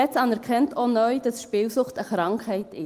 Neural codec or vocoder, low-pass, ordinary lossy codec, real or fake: none; 14.4 kHz; Opus, 24 kbps; real